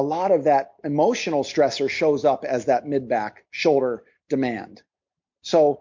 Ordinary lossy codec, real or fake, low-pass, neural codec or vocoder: MP3, 48 kbps; real; 7.2 kHz; none